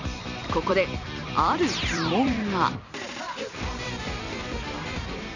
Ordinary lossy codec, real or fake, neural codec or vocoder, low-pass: none; fake; vocoder, 44.1 kHz, 128 mel bands every 256 samples, BigVGAN v2; 7.2 kHz